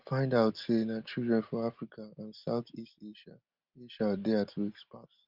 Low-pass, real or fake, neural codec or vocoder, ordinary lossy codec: 5.4 kHz; real; none; Opus, 32 kbps